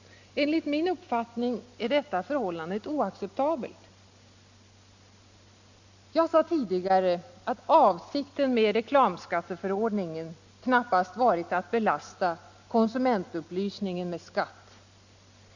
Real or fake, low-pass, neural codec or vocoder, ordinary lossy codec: real; 7.2 kHz; none; Opus, 64 kbps